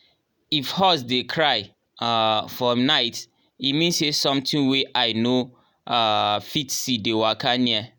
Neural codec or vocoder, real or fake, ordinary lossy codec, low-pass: none; real; none; none